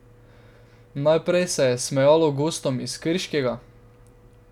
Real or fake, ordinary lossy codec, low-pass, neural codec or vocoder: real; none; 19.8 kHz; none